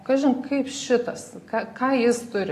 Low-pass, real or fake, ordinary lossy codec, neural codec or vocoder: 14.4 kHz; real; AAC, 48 kbps; none